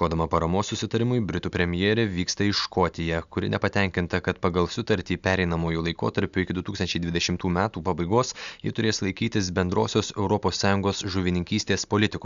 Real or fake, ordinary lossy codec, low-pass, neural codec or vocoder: real; Opus, 64 kbps; 7.2 kHz; none